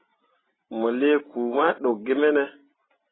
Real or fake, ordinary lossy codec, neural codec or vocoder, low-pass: real; AAC, 16 kbps; none; 7.2 kHz